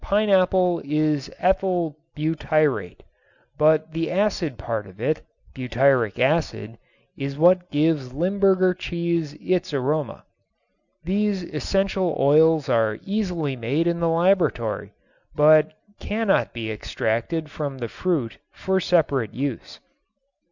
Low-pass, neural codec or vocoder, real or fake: 7.2 kHz; none; real